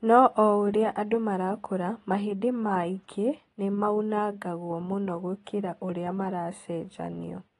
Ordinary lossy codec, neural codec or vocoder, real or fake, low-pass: AAC, 32 kbps; none; real; 10.8 kHz